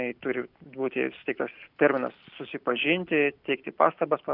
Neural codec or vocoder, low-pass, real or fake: none; 5.4 kHz; real